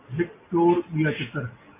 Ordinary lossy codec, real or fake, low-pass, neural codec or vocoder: MP3, 24 kbps; real; 3.6 kHz; none